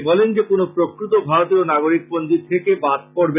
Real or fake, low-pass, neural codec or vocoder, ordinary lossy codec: real; 3.6 kHz; none; none